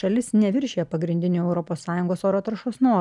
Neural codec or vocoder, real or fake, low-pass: none; real; 10.8 kHz